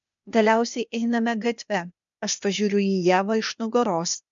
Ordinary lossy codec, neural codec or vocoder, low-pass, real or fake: MP3, 64 kbps; codec, 16 kHz, 0.8 kbps, ZipCodec; 7.2 kHz; fake